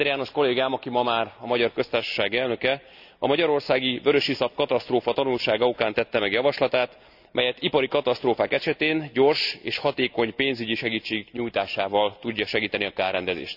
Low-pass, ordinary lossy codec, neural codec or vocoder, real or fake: 5.4 kHz; none; none; real